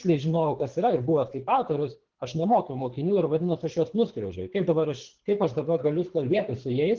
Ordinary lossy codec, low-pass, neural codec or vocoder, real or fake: Opus, 16 kbps; 7.2 kHz; codec, 24 kHz, 3 kbps, HILCodec; fake